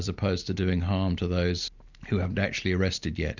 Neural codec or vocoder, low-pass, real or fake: none; 7.2 kHz; real